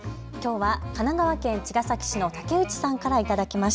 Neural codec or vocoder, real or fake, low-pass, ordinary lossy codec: none; real; none; none